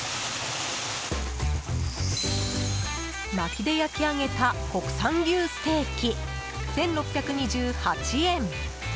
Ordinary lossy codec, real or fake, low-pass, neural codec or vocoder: none; real; none; none